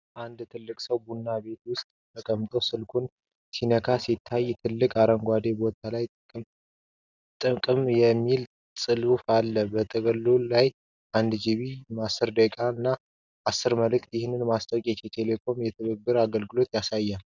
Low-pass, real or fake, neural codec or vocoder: 7.2 kHz; real; none